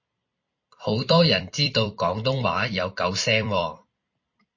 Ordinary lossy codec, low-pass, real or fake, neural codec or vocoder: MP3, 32 kbps; 7.2 kHz; fake; vocoder, 44.1 kHz, 128 mel bands every 256 samples, BigVGAN v2